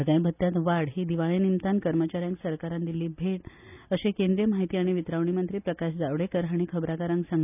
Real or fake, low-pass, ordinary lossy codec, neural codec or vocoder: real; 3.6 kHz; none; none